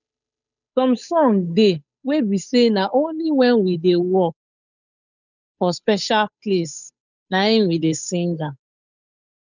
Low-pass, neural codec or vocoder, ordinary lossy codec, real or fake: 7.2 kHz; codec, 16 kHz, 8 kbps, FunCodec, trained on Chinese and English, 25 frames a second; none; fake